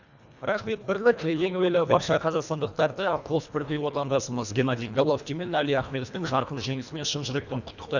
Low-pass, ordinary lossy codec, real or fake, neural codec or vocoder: 7.2 kHz; none; fake; codec, 24 kHz, 1.5 kbps, HILCodec